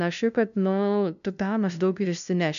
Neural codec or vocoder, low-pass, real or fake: codec, 16 kHz, 0.5 kbps, FunCodec, trained on LibriTTS, 25 frames a second; 7.2 kHz; fake